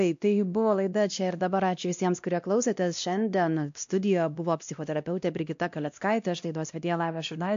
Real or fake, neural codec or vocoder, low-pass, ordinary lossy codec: fake; codec, 16 kHz, 1 kbps, X-Codec, WavLM features, trained on Multilingual LibriSpeech; 7.2 kHz; AAC, 64 kbps